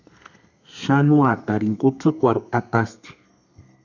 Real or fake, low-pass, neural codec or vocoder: fake; 7.2 kHz; codec, 32 kHz, 1.9 kbps, SNAC